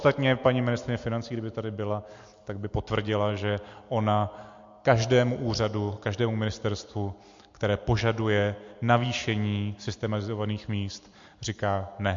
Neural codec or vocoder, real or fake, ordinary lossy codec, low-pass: none; real; MP3, 48 kbps; 7.2 kHz